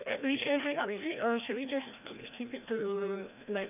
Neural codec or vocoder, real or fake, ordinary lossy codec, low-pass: codec, 16 kHz, 1 kbps, FreqCodec, larger model; fake; none; 3.6 kHz